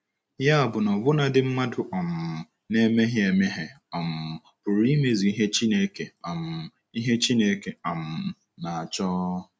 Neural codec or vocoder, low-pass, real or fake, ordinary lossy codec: none; none; real; none